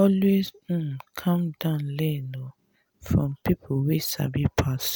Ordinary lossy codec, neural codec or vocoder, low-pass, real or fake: none; none; none; real